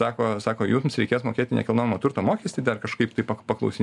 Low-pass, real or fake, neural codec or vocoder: 10.8 kHz; real; none